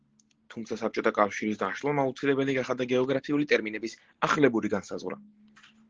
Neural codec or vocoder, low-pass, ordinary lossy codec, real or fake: none; 7.2 kHz; Opus, 16 kbps; real